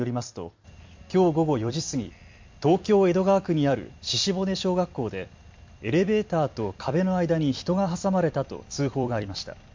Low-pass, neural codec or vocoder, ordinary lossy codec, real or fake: 7.2 kHz; vocoder, 44.1 kHz, 128 mel bands every 256 samples, BigVGAN v2; MP3, 48 kbps; fake